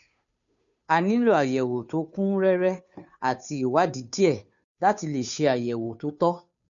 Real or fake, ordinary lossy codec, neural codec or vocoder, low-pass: fake; none; codec, 16 kHz, 2 kbps, FunCodec, trained on Chinese and English, 25 frames a second; 7.2 kHz